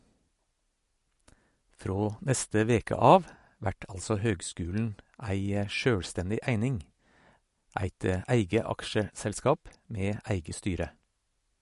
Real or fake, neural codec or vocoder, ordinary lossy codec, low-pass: real; none; MP3, 48 kbps; 14.4 kHz